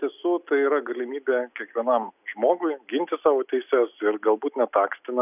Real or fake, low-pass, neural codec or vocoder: real; 3.6 kHz; none